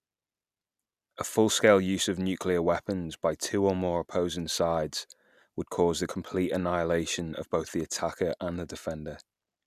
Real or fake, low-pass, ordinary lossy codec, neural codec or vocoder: real; 14.4 kHz; none; none